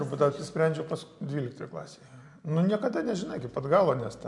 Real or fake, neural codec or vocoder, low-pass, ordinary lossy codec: real; none; 10.8 kHz; MP3, 64 kbps